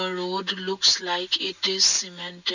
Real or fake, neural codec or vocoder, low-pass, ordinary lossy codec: real; none; 7.2 kHz; none